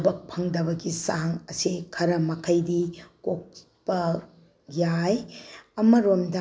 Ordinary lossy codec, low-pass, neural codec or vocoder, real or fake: none; none; none; real